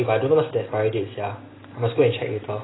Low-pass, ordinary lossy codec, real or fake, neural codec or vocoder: 7.2 kHz; AAC, 16 kbps; real; none